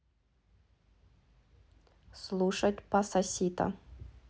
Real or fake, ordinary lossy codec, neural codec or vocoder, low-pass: real; none; none; none